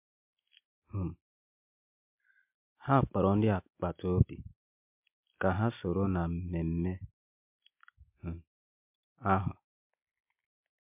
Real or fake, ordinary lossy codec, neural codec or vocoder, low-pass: real; MP3, 32 kbps; none; 3.6 kHz